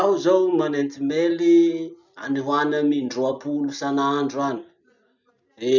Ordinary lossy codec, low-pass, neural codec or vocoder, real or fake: none; 7.2 kHz; none; real